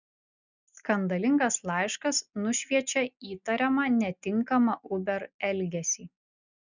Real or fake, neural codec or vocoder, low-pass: real; none; 7.2 kHz